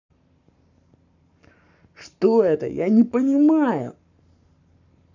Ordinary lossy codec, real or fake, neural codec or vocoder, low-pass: none; fake; codec, 44.1 kHz, 7.8 kbps, Pupu-Codec; 7.2 kHz